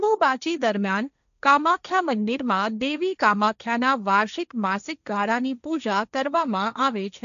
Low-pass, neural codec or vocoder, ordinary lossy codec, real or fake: 7.2 kHz; codec, 16 kHz, 1.1 kbps, Voila-Tokenizer; none; fake